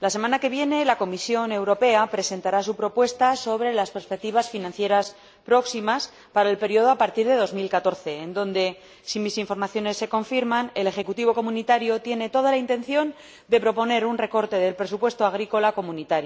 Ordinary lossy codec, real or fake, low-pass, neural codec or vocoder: none; real; none; none